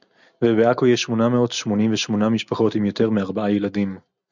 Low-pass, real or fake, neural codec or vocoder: 7.2 kHz; real; none